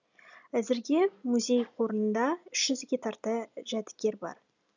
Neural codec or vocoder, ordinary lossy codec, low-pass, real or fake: none; none; 7.2 kHz; real